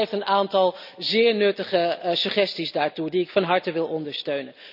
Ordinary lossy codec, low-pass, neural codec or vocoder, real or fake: none; 5.4 kHz; none; real